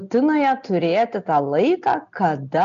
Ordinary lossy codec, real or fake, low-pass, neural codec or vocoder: MP3, 96 kbps; real; 7.2 kHz; none